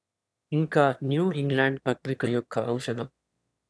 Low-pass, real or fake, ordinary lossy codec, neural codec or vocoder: none; fake; none; autoencoder, 22.05 kHz, a latent of 192 numbers a frame, VITS, trained on one speaker